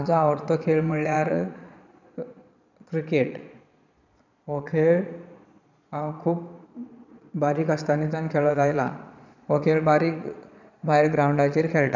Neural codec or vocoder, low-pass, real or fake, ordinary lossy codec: vocoder, 22.05 kHz, 80 mel bands, Vocos; 7.2 kHz; fake; none